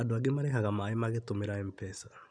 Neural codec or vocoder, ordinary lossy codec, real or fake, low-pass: none; none; real; 9.9 kHz